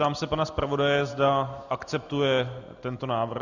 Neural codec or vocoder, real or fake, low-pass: none; real; 7.2 kHz